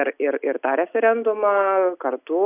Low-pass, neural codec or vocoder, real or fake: 3.6 kHz; none; real